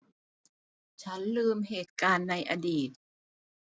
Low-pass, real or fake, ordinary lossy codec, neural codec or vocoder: none; real; none; none